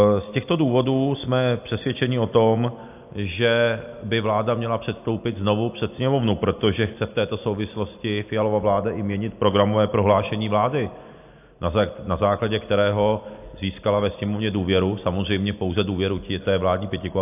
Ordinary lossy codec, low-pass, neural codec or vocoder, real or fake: AAC, 32 kbps; 3.6 kHz; none; real